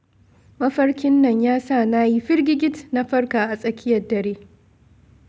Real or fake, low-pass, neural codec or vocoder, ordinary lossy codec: real; none; none; none